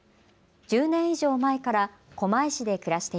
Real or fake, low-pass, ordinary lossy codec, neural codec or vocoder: real; none; none; none